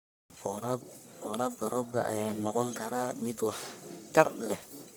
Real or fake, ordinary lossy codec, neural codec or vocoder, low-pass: fake; none; codec, 44.1 kHz, 1.7 kbps, Pupu-Codec; none